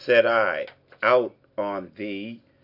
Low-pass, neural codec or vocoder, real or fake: 5.4 kHz; none; real